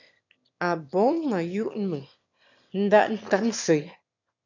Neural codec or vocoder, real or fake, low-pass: autoencoder, 22.05 kHz, a latent of 192 numbers a frame, VITS, trained on one speaker; fake; 7.2 kHz